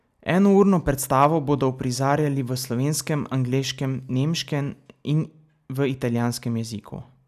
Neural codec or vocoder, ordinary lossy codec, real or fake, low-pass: none; none; real; 14.4 kHz